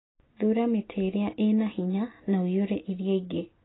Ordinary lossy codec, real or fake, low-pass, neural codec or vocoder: AAC, 16 kbps; real; 7.2 kHz; none